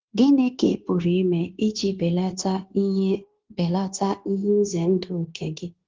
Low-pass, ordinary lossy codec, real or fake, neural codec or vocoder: 7.2 kHz; Opus, 16 kbps; fake; codec, 16 kHz, 0.9 kbps, LongCat-Audio-Codec